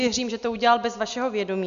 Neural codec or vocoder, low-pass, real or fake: none; 7.2 kHz; real